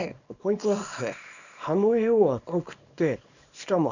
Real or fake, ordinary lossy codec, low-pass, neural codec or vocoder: fake; none; 7.2 kHz; codec, 24 kHz, 0.9 kbps, WavTokenizer, small release